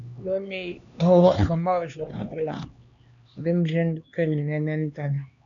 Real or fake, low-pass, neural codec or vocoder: fake; 7.2 kHz; codec, 16 kHz, 2 kbps, X-Codec, HuBERT features, trained on LibriSpeech